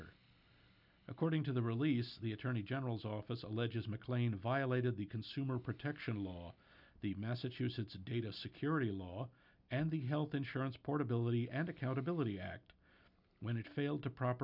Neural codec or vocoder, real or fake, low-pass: none; real; 5.4 kHz